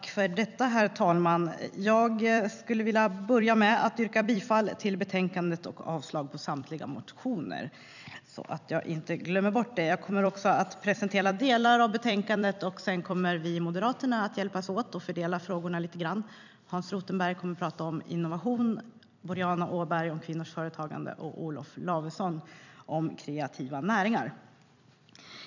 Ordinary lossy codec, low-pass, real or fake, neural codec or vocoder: none; 7.2 kHz; fake; vocoder, 44.1 kHz, 128 mel bands every 256 samples, BigVGAN v2